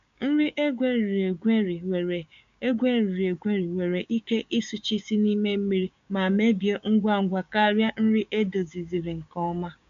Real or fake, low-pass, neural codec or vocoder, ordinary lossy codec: fake; 7.2 kHz; codec, 16 kHz, 6 kbps, DAC; MP3, 64 kbps